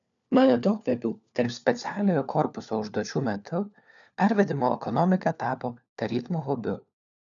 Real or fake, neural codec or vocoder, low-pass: fake; codec, 16 kHz, 4 kbps, FunCodec, trained on LibriTTS, 50 frames a second; 7.2 kHz